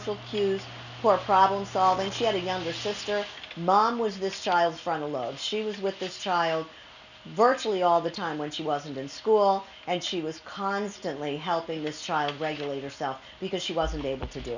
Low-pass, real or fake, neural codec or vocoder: 7.2 kHz; real; none